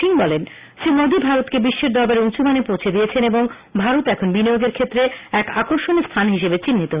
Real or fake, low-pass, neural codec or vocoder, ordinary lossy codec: real; 3.6 kHz; none; Opus, 64 kbps